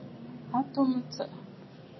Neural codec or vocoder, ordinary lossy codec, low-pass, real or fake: none; MP3, 24 kbps; 7.2 kHz; real